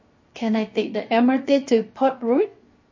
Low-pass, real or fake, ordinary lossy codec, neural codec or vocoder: 7.2 kHz; fake; MP3, 32 kbps; codec, 16 kHz, 0.7 kbps, FocalCodec